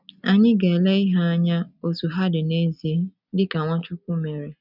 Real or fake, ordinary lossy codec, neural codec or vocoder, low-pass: real; none; none; 5.4 kHz